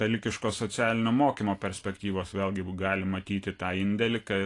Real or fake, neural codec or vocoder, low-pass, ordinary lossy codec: real; none; 10.8 kHz; AAC, 48 kbps